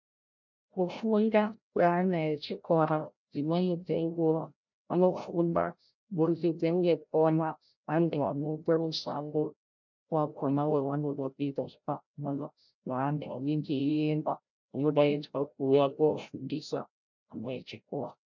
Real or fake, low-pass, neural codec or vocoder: fake; 7.2 kHz; codec, 16 kHz, 0.5 kbps, FreqCodec, larger model